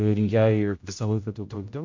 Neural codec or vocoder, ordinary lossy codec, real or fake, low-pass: codec, 16 kHz, 0.5 kbps, X-Codec, HuBERT features, trained on general audio; MP3, 48 kbps; fake; 7.2 kHz